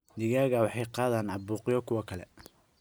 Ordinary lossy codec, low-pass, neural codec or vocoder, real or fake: none; none; none; real